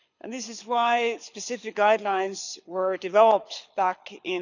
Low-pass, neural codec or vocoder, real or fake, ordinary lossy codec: 7.2 kHz; codec, 24 kHz, 6 kbps, HILCodec; fake; none